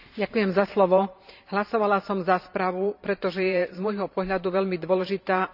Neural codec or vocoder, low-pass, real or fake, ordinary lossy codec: vocoder, 44.1 kHz, 128 mel bands every 512 samples, BigVGAN v2; 5.4 kHz; fake; none